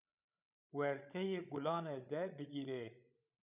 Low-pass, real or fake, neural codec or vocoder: 3.6 kHz; fake; codec, 16 kHz, 16 kbps, FreqCodec, larger model